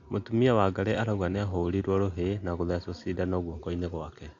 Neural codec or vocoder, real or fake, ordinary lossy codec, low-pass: none; real; AAC, 48 kbps; 7.2 kHz